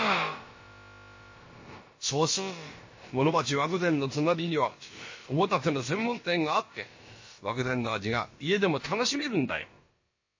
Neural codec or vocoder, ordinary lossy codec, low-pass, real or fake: codec, 16 kHz, about 1 kbps, DyCAST, with the encoder's durations; MP3, 32 kbps; 7.2 kHz; fake